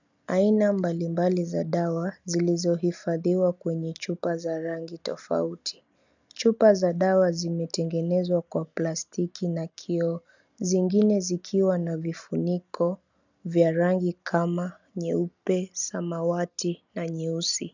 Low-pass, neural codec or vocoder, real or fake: 7.2 kHz; none; real